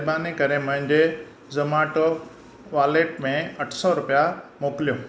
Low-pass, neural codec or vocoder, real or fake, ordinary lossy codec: none; none; real; none